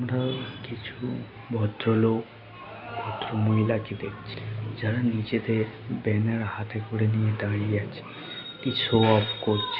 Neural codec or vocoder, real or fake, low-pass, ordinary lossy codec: none; real; 5.4 kHz; none